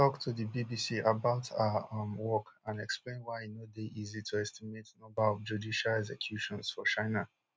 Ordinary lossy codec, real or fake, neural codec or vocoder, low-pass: none; real; none; none